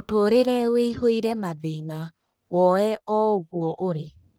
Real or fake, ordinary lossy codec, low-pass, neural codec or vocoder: fake; none; none; codec, 44.1 kHz, 1.7 kbps, Pupu-Codec